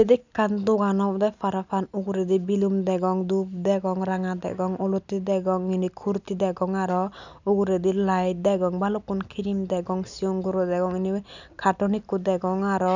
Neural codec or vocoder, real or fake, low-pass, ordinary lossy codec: none; real; 7.2 kHz; none